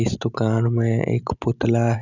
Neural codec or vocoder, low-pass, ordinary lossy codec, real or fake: none; 7.2 kHz; none; real